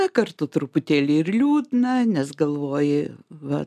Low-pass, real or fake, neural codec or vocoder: 14.4 kHz; real; none